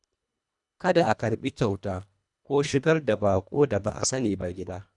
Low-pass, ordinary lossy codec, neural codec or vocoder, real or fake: 10.8 kHz; none; codec, 24 kHz, 1.5 kbps, HILCodec; fake